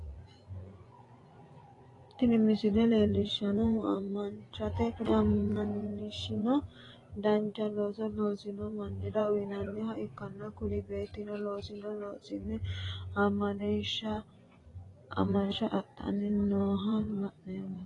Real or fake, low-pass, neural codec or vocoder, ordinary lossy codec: fake; 10.8 kHz; vocoder, 24 kHz, 100 mel bands, Vocos; AAC, 32 kbps